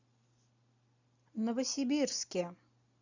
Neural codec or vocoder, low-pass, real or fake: none; 7.2 kHz; real